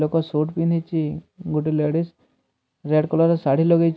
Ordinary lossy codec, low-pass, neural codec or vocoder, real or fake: none; none; none; real